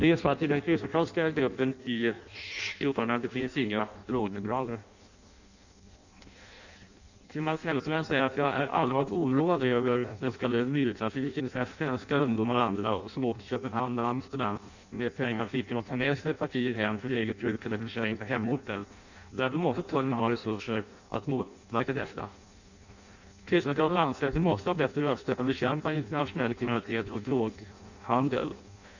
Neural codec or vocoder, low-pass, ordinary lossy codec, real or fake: codec, 16 kHz in and 24 kHz out, 0.6 kbps, FireRedTTS-2 codec; 7.2 kHz; none; fake